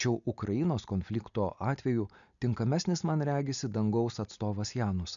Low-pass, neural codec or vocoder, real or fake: 7.2 kHz; none; real